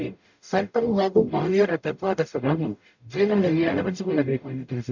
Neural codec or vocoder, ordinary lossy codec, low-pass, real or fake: codec, 44.1 kHz, 0.9 kbps, DAC; none; 7.2 kHz; fake